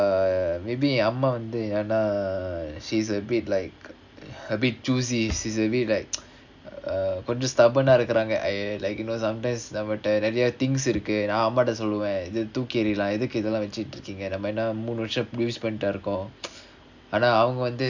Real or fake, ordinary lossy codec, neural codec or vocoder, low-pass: real; none; none; 7.2 kHz